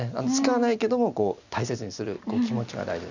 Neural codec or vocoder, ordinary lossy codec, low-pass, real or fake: none; none; 7.2 kHz; real